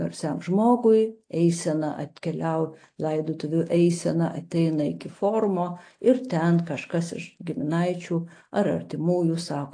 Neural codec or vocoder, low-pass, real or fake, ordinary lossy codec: none; 9.9 kHz; real; AAC, 48 kbps